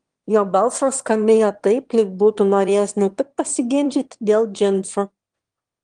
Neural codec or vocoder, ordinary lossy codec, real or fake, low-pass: autoencoder, 22.05 kHz, a latent of 192 numbers a frame, VITS, trained on one speaker; Opus, 24 kbps; fake; 9.9 kHz